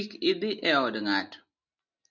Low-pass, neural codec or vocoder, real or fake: 7.2 kHz; none; real